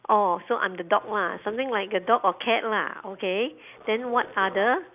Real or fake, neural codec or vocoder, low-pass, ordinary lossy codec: real; none; 3.6 kHz; none